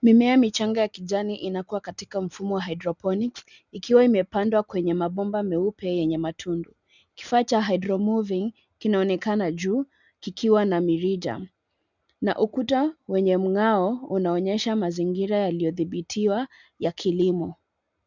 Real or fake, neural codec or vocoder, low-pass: real; none; 7.2 kHz